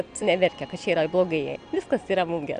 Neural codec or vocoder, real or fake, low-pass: none; real; 9.9 kHz